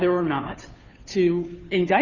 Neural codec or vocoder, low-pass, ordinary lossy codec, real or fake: codec, 16 kHz, 2 kbps, FunCodec, trained on Chinese and English, 25 frames a second; 7.2 kHz; Opus, 64 kbps; fake